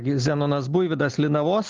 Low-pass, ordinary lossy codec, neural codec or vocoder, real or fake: 7.2 kHz; Opus, 32 kbps; none; real